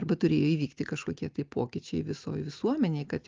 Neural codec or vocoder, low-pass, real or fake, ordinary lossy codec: none; 7.2 kHz; real; Opus, 24 kbps